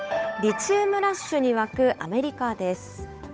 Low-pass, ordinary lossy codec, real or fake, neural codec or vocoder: none; none; fake; codec, 16 kHz, 8 kbps, FunCodec, trained on Chinese and English, 25 frames a second